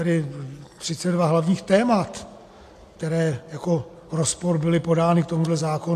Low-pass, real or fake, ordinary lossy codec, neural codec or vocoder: 14.4 kHz; real; AAC, 64 kbps; none